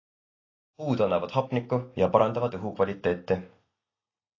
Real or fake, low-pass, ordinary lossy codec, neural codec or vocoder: real; 7.2 kHz; AAC, 32 kbps; none